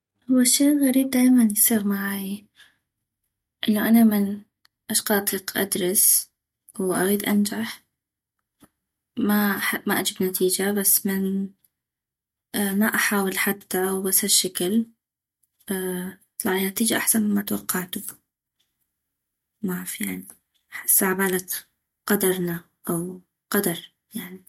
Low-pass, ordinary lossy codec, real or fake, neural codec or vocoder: 19.8 kHz; MP3, 64 kbps; real; none